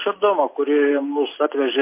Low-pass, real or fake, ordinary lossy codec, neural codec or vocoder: 3.6 kHz; real; MP3, 24 kbps; none